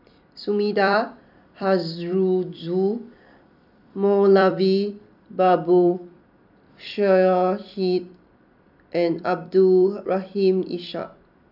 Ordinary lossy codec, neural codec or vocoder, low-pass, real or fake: none; vocoder, 44.1 kHz, 128 mel bands every 256 samples, BigVGAN v2; 5.4 kHz; fake